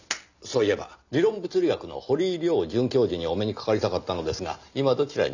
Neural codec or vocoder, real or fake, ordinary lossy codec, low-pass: none; real; none; 7.2 kHz